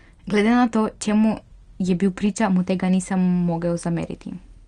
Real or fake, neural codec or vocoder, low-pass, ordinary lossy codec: real; none; 9.9 kHz; Opus, 24 kbps